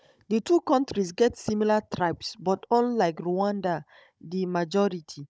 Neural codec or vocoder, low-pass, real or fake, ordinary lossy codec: codec, 16 kHz, 16 kbps, FunCodec, trained on Chinese and English, 50 frames a second; none; fake; none